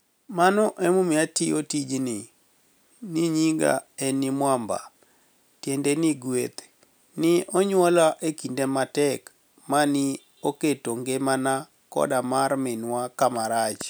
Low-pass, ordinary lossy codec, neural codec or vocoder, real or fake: none; none; none; real